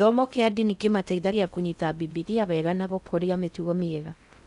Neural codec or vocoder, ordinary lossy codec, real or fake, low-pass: codec, 16 kHz in and 24 kHz out, 0.6 kbps, FocalCodec, streaming, 4096 codes; none; fake; 10.8 kHz